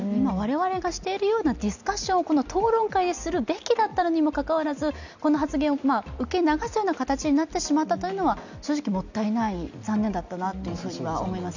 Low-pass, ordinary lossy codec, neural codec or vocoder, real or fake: 7.2 kHz; none; none; real